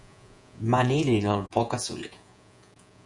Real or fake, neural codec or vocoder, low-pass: fake; vocoder, 48 kHz, 128 mel bands, Vocos; 10.8 kHz